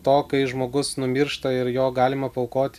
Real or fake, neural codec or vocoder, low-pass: real; none; 14.4 kHz